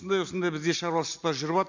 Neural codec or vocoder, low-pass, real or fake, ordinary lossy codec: none; 7.2 kHz; real; none